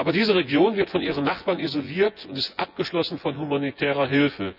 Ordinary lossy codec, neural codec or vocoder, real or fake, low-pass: none; vocoder, 24 kHz, 100 mel bands, Vocos; fake; 5.4 kHz